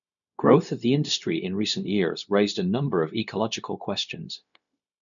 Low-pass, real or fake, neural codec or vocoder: 7.2 kHz; fake; codec, 16 kHz, 0.4 kbps, LongCat-Audio-Codec